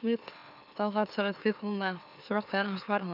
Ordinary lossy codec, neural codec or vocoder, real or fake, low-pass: none; autoencoder, 44.1 kHz, a latent of 192 numbers a frame, MeloTTS; fake; 5.4 kHz